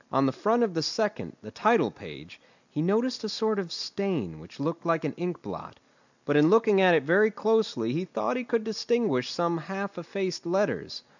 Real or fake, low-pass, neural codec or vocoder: real; 7.2 kHz; none